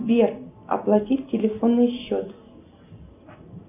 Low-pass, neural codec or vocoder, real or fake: 3.6 kHz; none; real